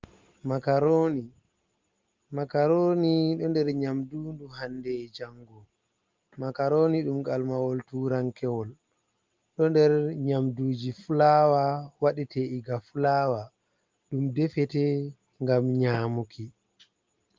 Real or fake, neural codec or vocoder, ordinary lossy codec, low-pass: real; none; Opus, 32 kbps; 7.2 kHz